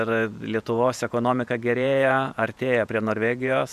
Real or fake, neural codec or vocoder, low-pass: fake; vocoder, 48 kHz, 128 mel bands, Vocos; 14.4 kHz